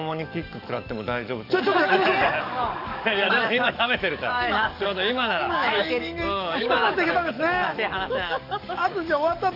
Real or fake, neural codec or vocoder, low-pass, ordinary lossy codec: fake; codec, 44.1 kHz, 7.8 kbps, DAC; 5.4 kHz; none